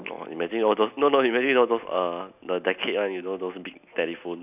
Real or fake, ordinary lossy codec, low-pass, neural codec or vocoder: real; none; 3.6 kHz; none